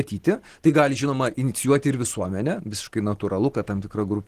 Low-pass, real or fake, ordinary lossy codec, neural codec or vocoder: 14.4 kHz; real; Opus, 16 kbps; none